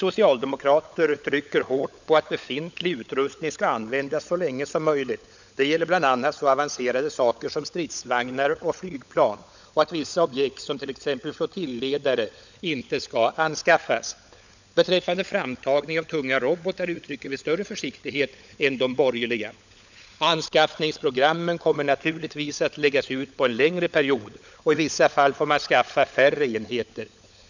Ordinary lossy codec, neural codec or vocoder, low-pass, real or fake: none; codec, 16 kHz, 16 kbps, FunCodec, trained on LibriTTS, 50 frames a second; 7.2 kHz; fake